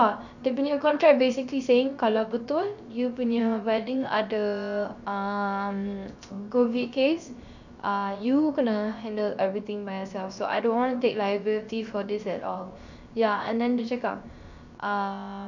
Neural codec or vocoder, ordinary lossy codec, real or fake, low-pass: codec, 16 kHz, 0.7 kbps, FocalCodec; none; fake; 7.2 kHz